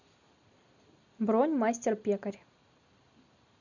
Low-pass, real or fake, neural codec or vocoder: 7.2 kHz; real; none